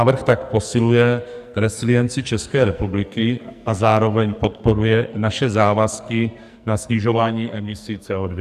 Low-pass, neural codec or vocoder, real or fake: 14.4 kHz; codec, 44.1 kHz, 2.6 kbps, SNAC; fake